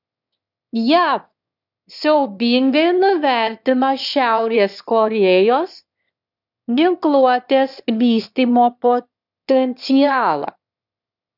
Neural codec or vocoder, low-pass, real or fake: autoencoder, 22.05 kHz, a latent of 192 numbers a frame, VITS, trained on one speaker; 5.4 kHz; fake